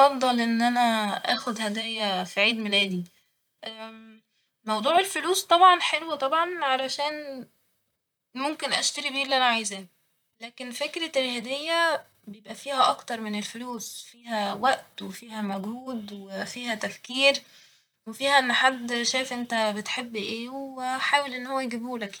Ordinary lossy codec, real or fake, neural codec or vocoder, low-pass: none; fake; vocoder, 44.1 kHz, 128 mel bands, Pupu-Vocoder; none